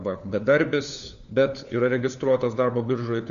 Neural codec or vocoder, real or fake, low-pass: codec, 16 kHz, 4 kbps, FunCodec, trained on LibriTTS, 50 frames a second; fake; 7.2 kHz